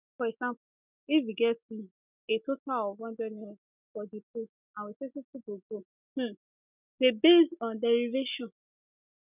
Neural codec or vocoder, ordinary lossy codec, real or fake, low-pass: none; none; real; 3.6 kHz